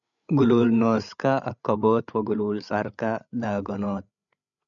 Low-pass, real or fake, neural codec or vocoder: 7.2 kHz; fake; codec, 16 kHz, 8 kbps, FreqCodec, larger model